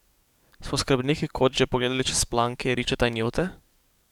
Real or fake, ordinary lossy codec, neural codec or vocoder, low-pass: fake; none; codec, 44.1 kHz, 7.8 kbps, DAC; 19.8 kHz